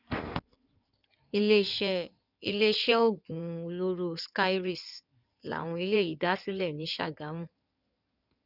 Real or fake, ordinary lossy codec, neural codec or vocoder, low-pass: fake; none; codec, 16 kHz in and 24 kHz out, 2.2 kbps, FireRedTTS-2 codec; 5.4 kHz